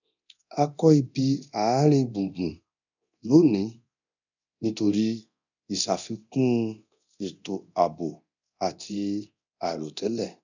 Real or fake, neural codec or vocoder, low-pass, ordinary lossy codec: fake; codec, 24 kHz, 0.9 kbps, DualCodec; 7.2 kHz; none